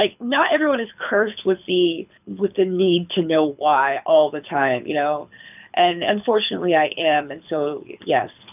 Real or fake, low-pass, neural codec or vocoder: fake; 3.6 kHz; codec, 24 kHz, 6 kbps, HILCodec